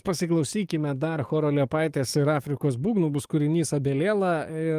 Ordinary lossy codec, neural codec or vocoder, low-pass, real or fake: Opus, 32 kbps; codec, 44.1 kHz, 7.8 kbps, DAC; 14.4 kHz; fake